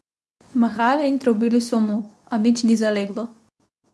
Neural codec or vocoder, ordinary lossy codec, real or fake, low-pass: codec, 24 kHz, 0.9 kbps, WavTokenizer, medium speech release version 2; none; fake; none